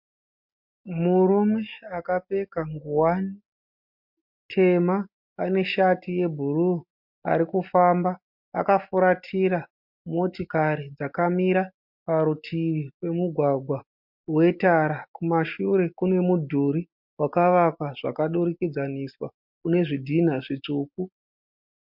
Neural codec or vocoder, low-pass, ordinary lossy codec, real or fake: none; 5.4 kHz; MP3, 48 kbps; real